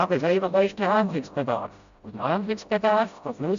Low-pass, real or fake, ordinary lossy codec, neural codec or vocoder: 7.2 kHz; fake; Opus, 64 kbps; codec, 16 kHz, 0.5 kbps, FreqCodec, smaller model